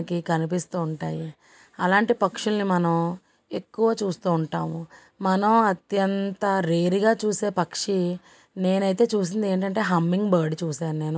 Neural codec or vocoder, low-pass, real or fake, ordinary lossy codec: none; none; real; none